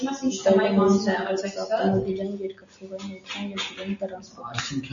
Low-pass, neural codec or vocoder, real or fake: 7.2 kHz; none; real